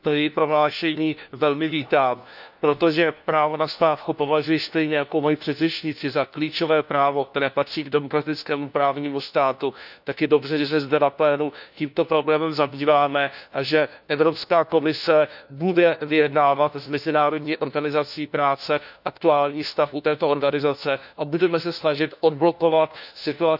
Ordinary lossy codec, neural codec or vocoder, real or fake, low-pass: none; codec, 16 kHz, 1 kbps, FunCodec, trained on LibriTTS, 50 frames a second; fake; 5.4 kHz